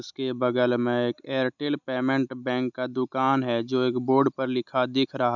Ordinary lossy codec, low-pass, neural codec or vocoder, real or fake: none; 7.2 kHz; none; real